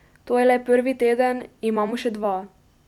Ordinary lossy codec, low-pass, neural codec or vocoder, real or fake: none; 19.8 kHz; vocoder, 44.1 kHz, 128 mel bands every 256 samples, BigVGAN v2; fake